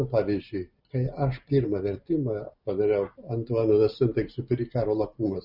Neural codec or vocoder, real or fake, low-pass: none; real; 5.4 kHz